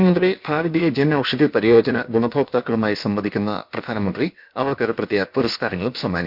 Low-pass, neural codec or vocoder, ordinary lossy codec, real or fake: 5.4 kHz; codec, 16 kHz, about 1 kbps, DyCAST, with the encoder's durations; none; fake